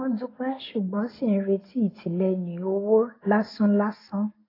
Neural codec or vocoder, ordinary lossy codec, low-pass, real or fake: none; AAC, 24 kbps; 5.4 kHz; real